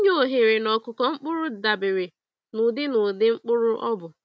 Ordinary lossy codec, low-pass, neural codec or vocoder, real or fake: none; none; none; real